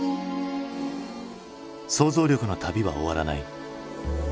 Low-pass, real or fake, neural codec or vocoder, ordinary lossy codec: none; real; none; none